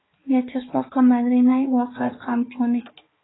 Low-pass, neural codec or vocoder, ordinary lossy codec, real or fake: 7.2 kHz; codec, 16 kHz, 4 kbps, X-Codec, HuBERT features, trained on balanced general audio; AAC, 16 kbps; fake